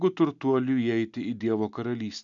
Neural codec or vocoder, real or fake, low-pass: none; real; 7.2 kHz